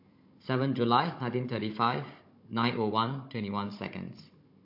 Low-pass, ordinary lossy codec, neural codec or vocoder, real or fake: 5.4 kHz; MP3, 32 kbps; codec, 16 kHz, 16 kbps, FunCodec, trained on Chinese and English, 50 frames a second; fake